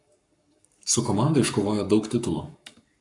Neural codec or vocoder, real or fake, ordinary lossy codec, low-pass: codec, 44.1 kHz, 7.8 kbps, Pupu-Codec; fake; AAC, 64 kbps; 10.8 kHz